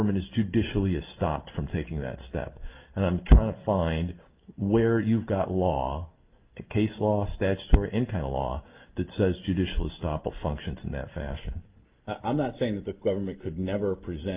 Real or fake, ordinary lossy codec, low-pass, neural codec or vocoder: real; Opus, 32 kbps; 3.6 kHz; none